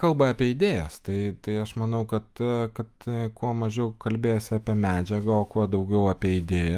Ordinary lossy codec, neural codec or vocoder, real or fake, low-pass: Opus, 32 kbps; autoencoder, 48 kHz, 128 numbers a frame, DAC-VAE, trained on Japanese speech; fake; 14.4 kHz